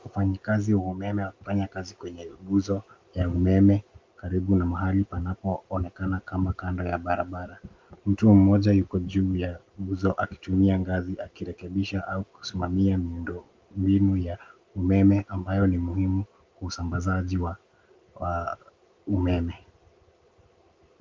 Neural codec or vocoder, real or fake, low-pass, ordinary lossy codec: none; real; 7.2 kHz; Opus, 32 kbps